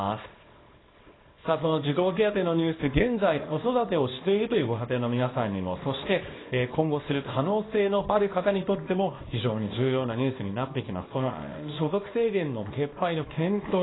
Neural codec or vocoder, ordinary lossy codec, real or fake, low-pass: codec, 24 kHz, 0.9 kbps, WavTokenizer, small release; AAC, 16 kbps; fake; 7.2 kHz